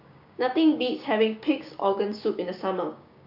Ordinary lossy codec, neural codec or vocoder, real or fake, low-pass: none; codec, 16 kHz, 6 kbps, DAC; fake; 5.4 kHz